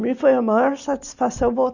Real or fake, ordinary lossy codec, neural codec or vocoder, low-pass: real; none; none; 7.2 kHz